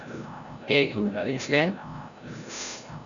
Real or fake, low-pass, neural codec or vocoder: fake; 7.2 kHz; codec, 16 kHz, 0.5 kbps, FreqCodec, larger model